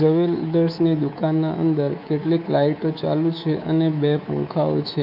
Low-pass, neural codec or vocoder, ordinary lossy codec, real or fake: 5.4 kHz; codec, 24 kHz, 3.1 kbps, DualCodec; none; fake